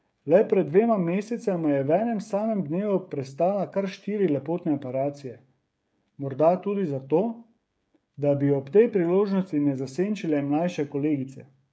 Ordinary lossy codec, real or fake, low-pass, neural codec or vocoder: none; fake; none; codec, 16 kHz, 16 kbps, FreqCodec, smaller model